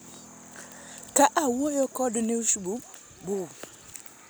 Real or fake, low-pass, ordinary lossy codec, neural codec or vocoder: real; none; none; none